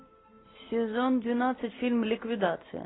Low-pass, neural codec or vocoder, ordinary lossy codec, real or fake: 7.2 kHz; none; AAC, 16 kbps; real